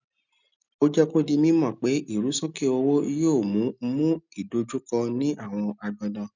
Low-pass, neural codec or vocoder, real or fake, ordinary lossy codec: 7.2 kHz; none; real; none